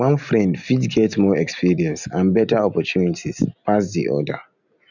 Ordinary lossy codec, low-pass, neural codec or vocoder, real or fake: none; 7.2 kHz; none; real